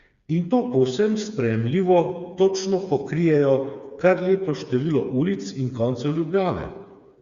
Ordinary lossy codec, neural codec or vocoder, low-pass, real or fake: Opus, 64 kbps; codec, 16 kHz, 4 kbps, FreqCodec, smaller model; 7.2 kHz; fake